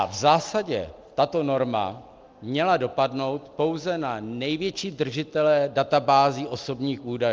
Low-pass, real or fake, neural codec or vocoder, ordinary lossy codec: 7.2 kHz; real; none; Opus, 24 kbps